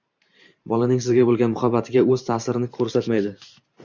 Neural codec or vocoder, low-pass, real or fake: vocoder, 44.1 kHz, 128 mel bands every 512 samples, BigVGAN v2; 7.2 kHz; fake